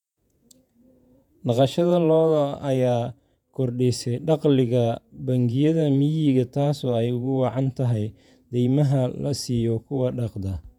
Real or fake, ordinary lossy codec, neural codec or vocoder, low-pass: fake; none; vocoder, 48 kHz, 128 mel bands, Vocos; 19.8 kHz